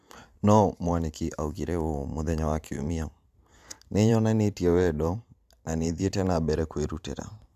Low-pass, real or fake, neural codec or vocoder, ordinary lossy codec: 14.4 kHz; fake; vocoder, 44.1 kHz, 128 mel bands every 512 samples, BigVGAN v2; AAC, 96 kbps